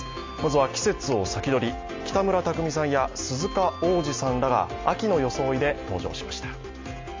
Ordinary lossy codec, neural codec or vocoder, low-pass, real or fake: MP3, 48 kbps; none; 7.2 kHz; real